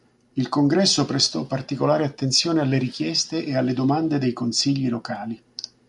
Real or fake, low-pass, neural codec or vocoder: real; 10.8 kHz; none